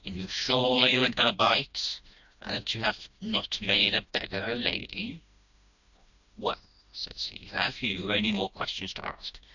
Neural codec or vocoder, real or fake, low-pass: codec, 16 kHz, 1 kbps, FreqCodec, smaller model; fake; 7.2 kHz